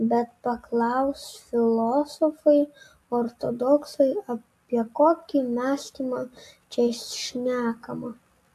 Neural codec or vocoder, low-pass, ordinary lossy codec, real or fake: none; 14.4 kHz; AAC, 64 kbps; real